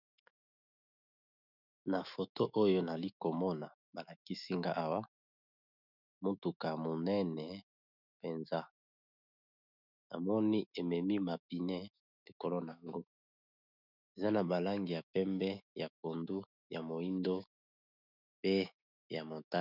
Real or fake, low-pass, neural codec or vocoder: fake; 5.4 kHz; autoencoder, 48 kHz, 128 numbers a frame, DAC-VAE, trained on Japanese speech